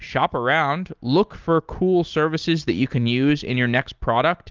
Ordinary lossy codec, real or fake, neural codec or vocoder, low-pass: Opus, 32 kbps; real; none; 7.2 kHz